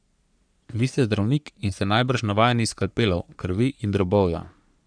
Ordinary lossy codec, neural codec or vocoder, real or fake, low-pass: none; codec, 44.1 kHz, 3.4 kbps, Pupu-Codec; fake; 9.9 kHz